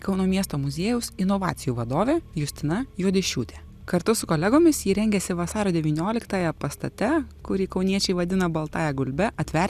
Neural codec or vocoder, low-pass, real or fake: none; 14.4 kHz; real